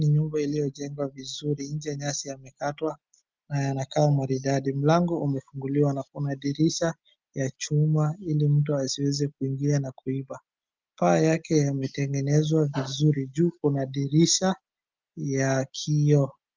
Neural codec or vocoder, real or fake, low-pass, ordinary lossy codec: none; real; 7.2 kHz; Opus, 32 kbps